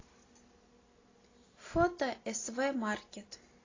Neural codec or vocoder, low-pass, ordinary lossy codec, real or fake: none; 7.2 kHz; AAC, 32 kbps; real